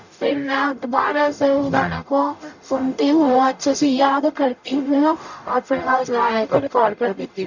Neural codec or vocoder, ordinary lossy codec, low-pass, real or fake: codec, 44.1 kHz, 0.9 kbps, DAC; none; 7.2 kHz; fake